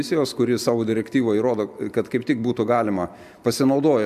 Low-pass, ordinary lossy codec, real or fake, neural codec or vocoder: 14.4 kHz; MP3, 96 kbps; real; none